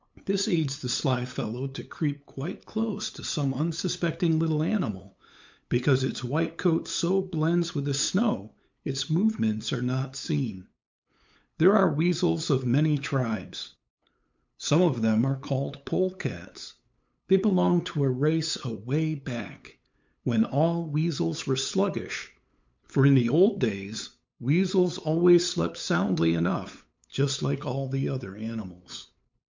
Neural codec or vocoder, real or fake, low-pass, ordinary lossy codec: codec, 16 kHz, 8 kbps, FunCodec, trained on LibriTTS, 25 frames a second; fake; 7.2 kHz; MP3, 64 kbps